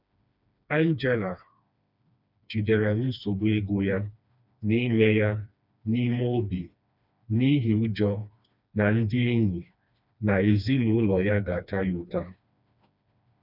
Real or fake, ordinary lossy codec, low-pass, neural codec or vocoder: fake; none; 5.4 kHz; codec, 16 kHz, 2 kbps, FreqCodec, smaller model